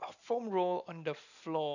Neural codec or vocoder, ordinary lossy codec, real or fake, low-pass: none; none; real; 7.2 kHz